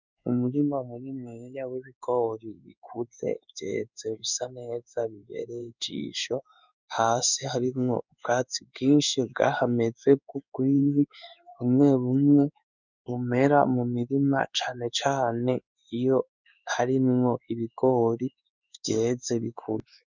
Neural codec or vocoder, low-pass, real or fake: codec, 16 kHz in and 24 kHz out, 1 kbps, XY-Tokenizer; 7.2 kHz; fake